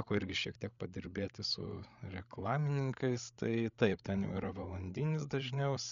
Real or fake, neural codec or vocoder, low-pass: fake; codec, 16 kHz, 8 kbps, FreqCodec, larger model; 7.2 kHz